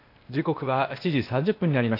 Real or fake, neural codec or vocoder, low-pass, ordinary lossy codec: fake; codec, 16 kHz, 0.8 kbps, ZipCodec; 5.4 kHz; AAC, 32 kbps